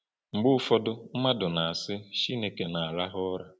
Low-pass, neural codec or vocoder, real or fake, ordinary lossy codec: none; none; real; none